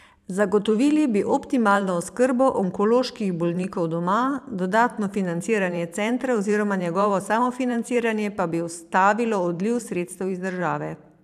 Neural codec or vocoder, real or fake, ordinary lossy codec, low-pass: vocoder, 44.1 kHz, 128 mel bands every 512 samples, BigVGAN v2; fake; none; 14.4 kHz